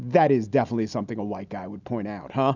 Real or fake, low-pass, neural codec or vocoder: real; 7.2 kHz; none